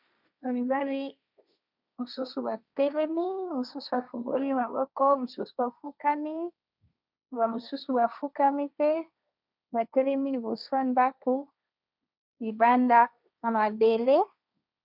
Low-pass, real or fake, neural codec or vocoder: 5.4 kHz; fake; codec, 16 kHz, 1.1 kbps, Voila-Tokenizer